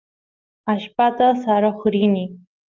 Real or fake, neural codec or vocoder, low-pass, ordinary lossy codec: real; none; 7.2 kHz; Opus, 32 kbps